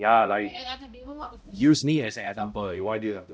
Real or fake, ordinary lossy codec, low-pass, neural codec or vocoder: fake; none; none; codec, 16 kHz, 0.5 kbps, X-Codec, HuBERT features, trained on balanced general audio